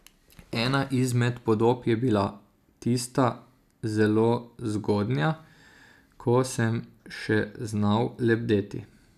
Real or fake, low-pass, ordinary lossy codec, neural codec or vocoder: real; 14.4 kHz; none; none